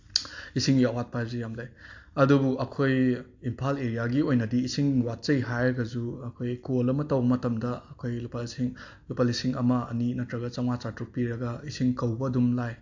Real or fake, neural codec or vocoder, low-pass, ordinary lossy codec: real; none; 7.2 kHz; AAC, 48 kbps